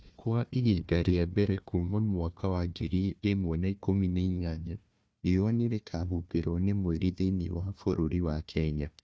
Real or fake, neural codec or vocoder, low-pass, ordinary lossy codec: fake; codec, 16 kHz, 1 kbps, FunCodec, trained on Chinese and English, 50 frames a second; none; none